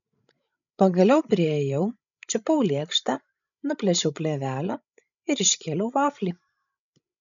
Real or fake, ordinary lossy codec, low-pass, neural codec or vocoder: fake; MP3, 96 kbps; 7.2 kHz; codec, 16 kHz, 16 kbps, FreqCodec, larger model